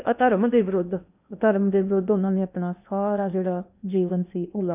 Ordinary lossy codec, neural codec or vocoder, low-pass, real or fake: none; codec, 16 kHz in and 24 kHz out, 0.8 kbps, FocalCodec, streaming, 65536 codes; 3.6 kHz; fake